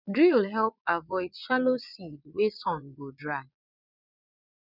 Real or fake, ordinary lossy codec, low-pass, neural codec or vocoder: fake; none; 5.4 kHz; vocoder, 22.05 kHz, 80 mel bands, Vocos